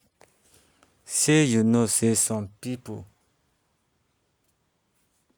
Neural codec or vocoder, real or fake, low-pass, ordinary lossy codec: none; real; none; none